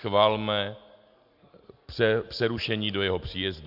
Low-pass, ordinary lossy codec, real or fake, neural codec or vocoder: 5.4 kHz; MP3, 48 kbps; real; none